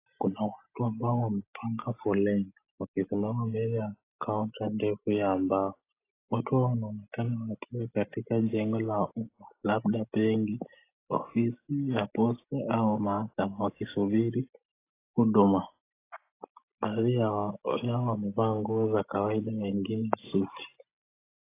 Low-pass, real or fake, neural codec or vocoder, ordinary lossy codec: 3.6 kHz; real; none; AAC, 24 kbps